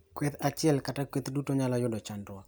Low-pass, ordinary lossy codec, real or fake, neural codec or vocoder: none; none; real; none